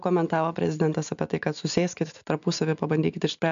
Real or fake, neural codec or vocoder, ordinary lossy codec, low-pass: real; none; MP3, 64 kbps; 7.2 kHz